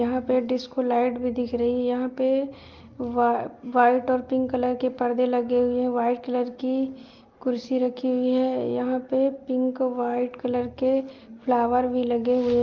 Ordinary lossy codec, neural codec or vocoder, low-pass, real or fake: Opus, 32 kbps; none; 7.2 kHz; real